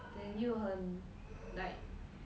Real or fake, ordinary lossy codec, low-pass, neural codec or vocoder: real; none; none; none